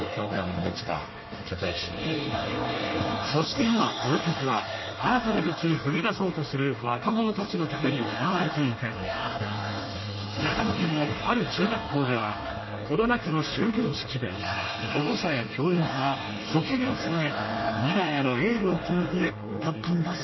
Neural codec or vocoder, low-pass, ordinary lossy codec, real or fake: codec, 24 kHz, 1 kbps, SNAC; 7.2 kHz; MP3, 24 kbps; fake